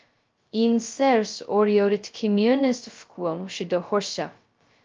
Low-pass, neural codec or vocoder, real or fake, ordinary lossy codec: 7.2 kHz; codec, 16 kHz, 0.2 kbps, FocalCodec; fake; Opus, 24 kbps